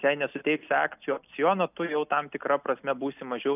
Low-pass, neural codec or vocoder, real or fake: 3.6 kHz; none; real